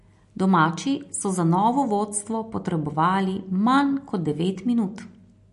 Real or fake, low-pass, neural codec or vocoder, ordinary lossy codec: real; 14.4 kHz; none; MP3, 48 kbps